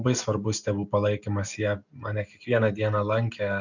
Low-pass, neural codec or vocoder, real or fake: 7.2 kHz; none; real